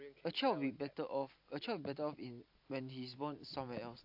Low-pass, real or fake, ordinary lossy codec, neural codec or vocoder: 5.4 kHz; real; AAC, 48 kbps; none